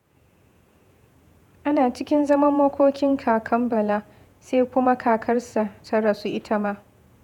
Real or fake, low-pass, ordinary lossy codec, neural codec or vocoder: fake; 19.8 kHz; none; vocoder, 48 kHz, 128 mel bands, Vocos